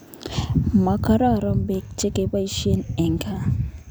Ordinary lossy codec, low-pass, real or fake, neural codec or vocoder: none; none; fake; vocoder, 44.1 kHz, 128 mel bands every 256 samples, BigVGAN v2